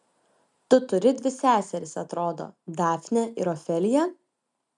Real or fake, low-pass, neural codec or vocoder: real; 10.8 kHz; none